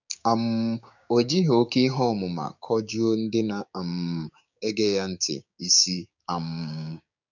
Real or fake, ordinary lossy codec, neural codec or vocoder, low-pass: fake; none; codec, 16 kHz, 6 kbps, DAC; 7.2 kHz